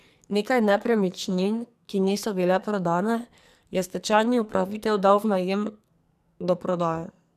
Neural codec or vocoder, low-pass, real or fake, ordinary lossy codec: codec, 44.1 kHz, 2.6 kbps, SNAC; 14.4 kHz; fake; none